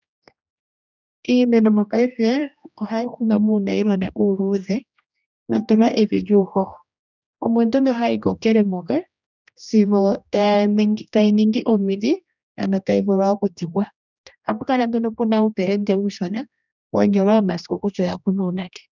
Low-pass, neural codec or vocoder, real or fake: 7.2 kHz; codec, 16 kHz, 1 kbps, X-Codec, HuBERT features, trained on general audio; fake